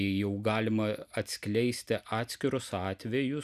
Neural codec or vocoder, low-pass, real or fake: none; 14.4 kHz; real